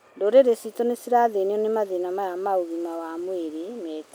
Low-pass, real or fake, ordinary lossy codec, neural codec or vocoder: none; real; none; none